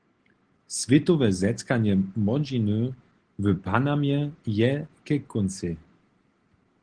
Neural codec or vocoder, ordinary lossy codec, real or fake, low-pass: none; Opus, 16 kbps; real; 9.9 kHz